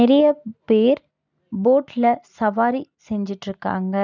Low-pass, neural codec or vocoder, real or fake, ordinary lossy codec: 7.2 kHz; none; real; none